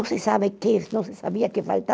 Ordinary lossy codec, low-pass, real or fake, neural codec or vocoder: none; none; real; none